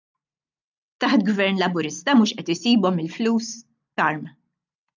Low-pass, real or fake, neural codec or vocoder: 7.2 kHz; real; none